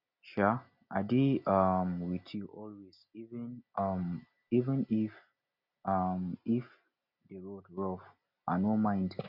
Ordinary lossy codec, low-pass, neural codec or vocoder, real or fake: none; 5.4 kHz; none; real